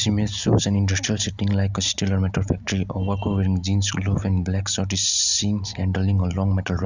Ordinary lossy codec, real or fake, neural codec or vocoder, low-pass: none; real; none; 7.2 kHz